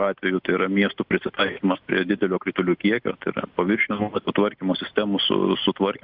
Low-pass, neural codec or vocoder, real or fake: 5.4 kHz; none; real